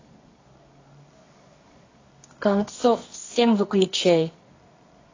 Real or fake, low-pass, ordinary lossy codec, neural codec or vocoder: fake; 7.2 kHz; AAC, 32 kbps; codec, 24 kHz, 0.9 kbps, WavTokenizer, medium music audio release